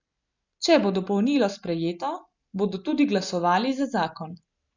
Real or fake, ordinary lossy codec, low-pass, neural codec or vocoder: real; none; 7.2 kHz; none